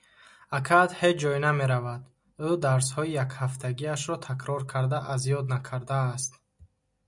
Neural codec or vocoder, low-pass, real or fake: none; 10.8 kHz; real